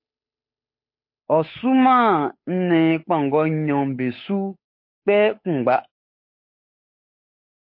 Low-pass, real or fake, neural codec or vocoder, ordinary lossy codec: 5.4 kHz; fake; codec, 16 kHz, 8 kbps, FunCodec, trained on Chinese and English, 25 frames a second; MP3, 32 kbps